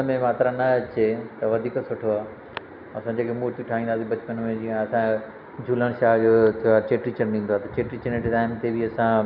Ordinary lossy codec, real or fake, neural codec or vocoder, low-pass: none; real; none; 5.4 kHz